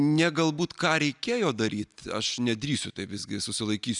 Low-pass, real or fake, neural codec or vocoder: 10.8 kHz; real; none